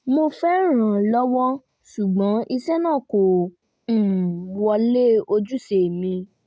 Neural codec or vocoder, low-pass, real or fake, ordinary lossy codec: none; none; real; none